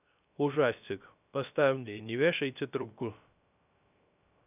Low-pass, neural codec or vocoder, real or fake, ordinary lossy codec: 3.6 kHz; codec, 16 kHz, 0.3 kbps, FocalCodec; fake; none